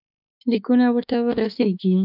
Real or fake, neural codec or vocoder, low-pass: fake; autoencoder, 48 kHz, 32 numbers a frame, DAC-VAE, trained on Japanese speech; 5.4 kHz